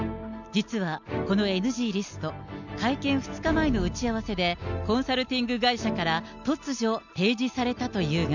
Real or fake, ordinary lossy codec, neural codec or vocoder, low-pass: real; none; none; 7.2 kHz